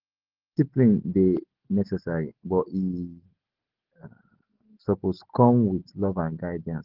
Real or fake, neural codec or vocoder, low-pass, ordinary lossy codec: real; none; 5.4 kHz; Opus, 16 kbps